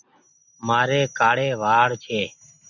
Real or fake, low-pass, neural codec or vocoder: real; 7.2 kHz; none